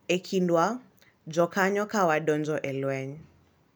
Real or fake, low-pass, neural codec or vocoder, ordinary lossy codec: real; none; none; none